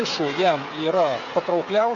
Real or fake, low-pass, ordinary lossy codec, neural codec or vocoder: fake; 7.2 kHz; AAC, 48 kbps; codec, 16 kHz, 16 kbps, FreqCodec, smaller model